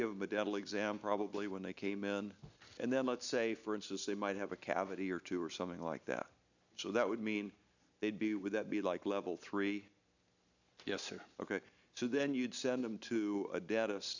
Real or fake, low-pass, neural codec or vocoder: real; 7.2 kHz; none